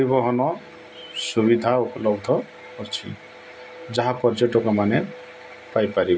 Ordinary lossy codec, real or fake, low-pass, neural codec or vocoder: none; real; none; none